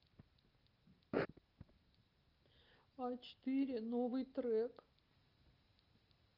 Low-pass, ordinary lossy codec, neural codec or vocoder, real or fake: 5.4 kHz; Opus, 32 kbps; none; real